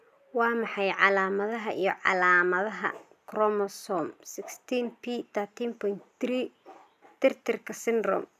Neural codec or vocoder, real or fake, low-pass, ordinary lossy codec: none; real; 14.4 kHz; none